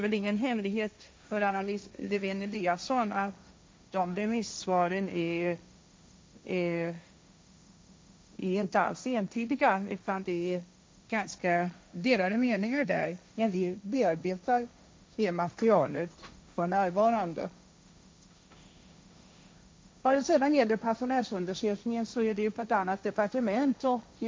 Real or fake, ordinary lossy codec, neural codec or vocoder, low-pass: fake; none; codec, 16 kHz, 1.1 kbps, Voila-Tokenizer; none